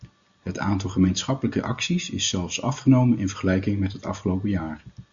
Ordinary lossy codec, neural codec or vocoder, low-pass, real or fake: Opus, 64 kbps; none; 7.2 kHz; real